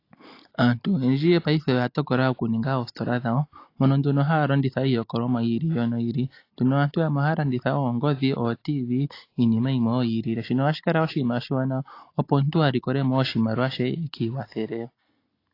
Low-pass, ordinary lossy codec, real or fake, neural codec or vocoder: 5.4 kHz; AAC, 32 kbps; real; none